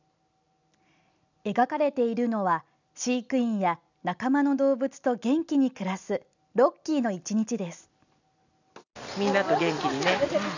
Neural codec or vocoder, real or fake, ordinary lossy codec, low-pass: none; real; none; 7.2 kHz